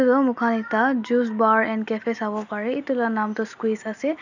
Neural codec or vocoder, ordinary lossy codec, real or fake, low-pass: none; none; real; 7.2 kHz